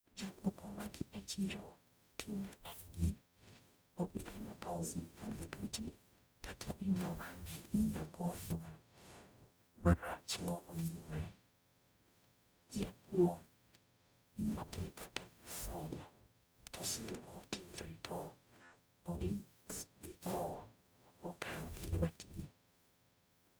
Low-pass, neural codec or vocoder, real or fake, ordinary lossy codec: none; codec, 44.1 kHz, 0.9 kbps, DAC; fake; none